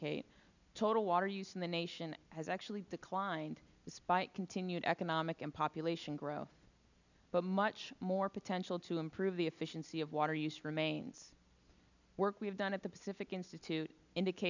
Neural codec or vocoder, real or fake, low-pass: none; real; 7.2 kHz